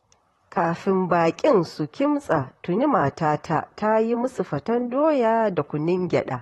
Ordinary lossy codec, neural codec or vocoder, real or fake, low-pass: AAC, 32 kbps; vocoder, 44.1 kHz, 128 mel bands, Pupu-Vocoder; fake; 19.8 kHz